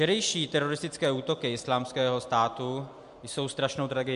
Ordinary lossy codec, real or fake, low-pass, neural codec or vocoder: MP3, 64 kbps; real; 10.8 kHz; none